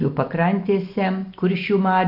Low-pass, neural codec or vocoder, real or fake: 5.4 kHz; none; real